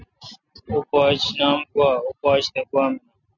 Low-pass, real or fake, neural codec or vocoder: 7.2 kHz; real; none